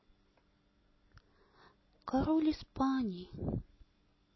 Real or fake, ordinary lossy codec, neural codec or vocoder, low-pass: real; MP3, 24 kbps; none; 7.2 kHz